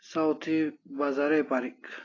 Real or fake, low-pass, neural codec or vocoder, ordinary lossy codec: real; 7.2 kHz; none; AAC, 32 kbps